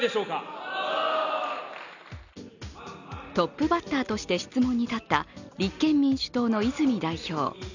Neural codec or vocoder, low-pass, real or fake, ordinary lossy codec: none; 7.2 kHz; real; none